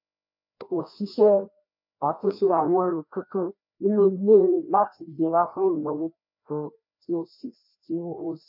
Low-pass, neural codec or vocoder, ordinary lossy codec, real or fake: 5.4 kHz; codec, 16 kHz, 1 kbps, FreqCodec, larger model; MP3, 48 kbps; fake